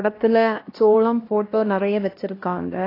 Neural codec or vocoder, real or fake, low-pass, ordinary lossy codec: codec, 16 kHz, 1 kbps, X-Codec, HuBERT features, trained on LibriSpeech; fake; 5.4 kHz; AAC, 24 kbps